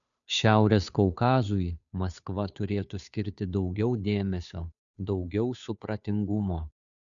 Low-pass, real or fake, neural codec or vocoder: 7.2 kHz; fake; codec, 16 kHz, 2 kbps, FunCodec, trained on Chinese and English, 25 frames a second